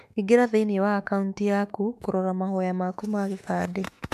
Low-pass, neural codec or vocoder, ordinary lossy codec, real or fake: 14.4 kHz; autoencoder, 48 kHz, 32 numbers a frame, DAC-VAE, trained on Japanese speech; MP3, 96 kbps; fake